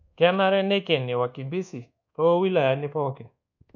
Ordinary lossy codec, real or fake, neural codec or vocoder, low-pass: none; fake; codec, 24 kHz, 1.2 kbps, DualCodec; 7.2 kHz